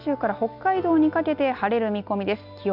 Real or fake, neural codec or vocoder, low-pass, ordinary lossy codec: real; none; 5.4 kHz; none